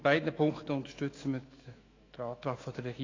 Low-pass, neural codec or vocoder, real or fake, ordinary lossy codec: 7.2 kHz; autoencoder, 48 kHz, 128 numbers a frame, DAC-VAE, trained on Japanese speech; fake; AAC, 32 kbps